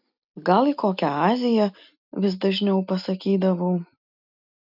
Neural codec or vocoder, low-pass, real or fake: none; 5.4 kHz; real